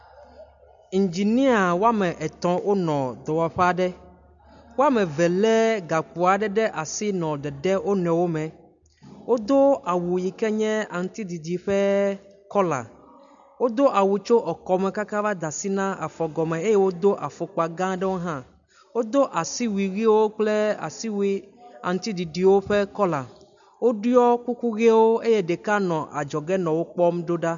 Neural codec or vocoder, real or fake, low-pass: none; real; 7.2 kHz